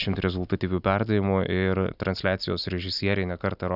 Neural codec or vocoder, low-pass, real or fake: none; 5.4 kHz; real